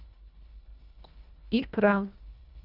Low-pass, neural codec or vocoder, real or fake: 5.4 kHz; codec, 24 kHz, 1.5 kbps, HILCodec; fake